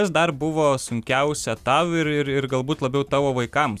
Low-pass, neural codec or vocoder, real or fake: 14.4 kHz; none; real